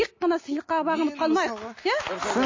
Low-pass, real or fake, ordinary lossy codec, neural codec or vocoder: 7.2 kHz; real; MP3, 32 kbps; none